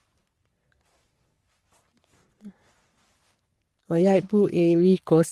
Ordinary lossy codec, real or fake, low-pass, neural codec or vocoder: Opus, 24 kbps; fake; 14.4 kHz; codec, 44.1 kHz, 3.4 kbps, Pupu-Codec